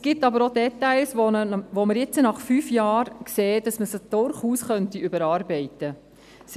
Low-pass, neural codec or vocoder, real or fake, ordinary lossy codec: 14.4 kHz; none; real; none